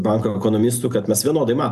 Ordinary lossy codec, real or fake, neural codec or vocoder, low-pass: AAC, 96 kbps; real; none; 14.4 kHz